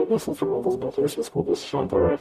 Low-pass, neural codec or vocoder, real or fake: 14.4 kHz; codec, 44.1 kHz, 0.9 kbps, DAC; fake